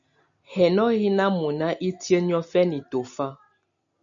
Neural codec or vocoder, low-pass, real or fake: none; 7.2 kHz; real